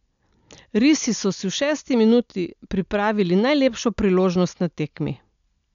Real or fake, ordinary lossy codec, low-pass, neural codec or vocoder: real; none; 7.2 kHz; none